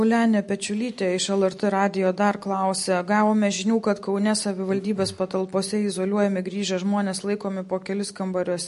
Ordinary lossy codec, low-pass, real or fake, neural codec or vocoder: MP3, 48 kbps; 14.4 kHz; real; none